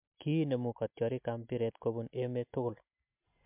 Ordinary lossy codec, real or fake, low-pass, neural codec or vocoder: MP3, 32 kbps; real; 3.6 kHz; none